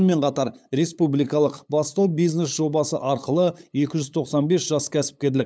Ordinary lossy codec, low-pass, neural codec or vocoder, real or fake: none; none; codec, 16 kHz, 8 kbps, FunCodec, trained on LibriTTS, 25 frames a second; fake